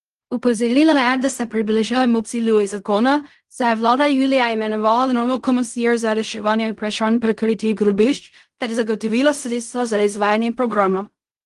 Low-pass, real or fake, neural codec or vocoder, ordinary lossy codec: 10.8 kHz; fake; codec, 16 kHz in and 24 kHz out, 0.4 kbps, LongCat-Audio-Codec, fine tuned four codebook decoder; Opus, 24 kbps